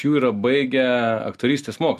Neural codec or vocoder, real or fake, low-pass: none; real; 14.4 kHz